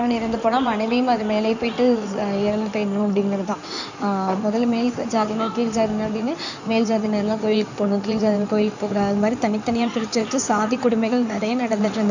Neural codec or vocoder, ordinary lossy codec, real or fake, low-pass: codec, 16 kHz in and 24 kHz out, 2.2 kbps, FireRedTTS-2 codec; none; fake; 7.2 kHz